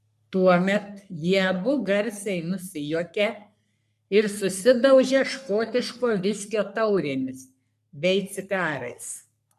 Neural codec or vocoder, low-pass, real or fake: codec, 44.1 kHz, 3.4 kbps, Pupu-Codec; 14.4 kHz; fake